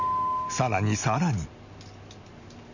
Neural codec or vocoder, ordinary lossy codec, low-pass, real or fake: none; none; 7.2 kHz; real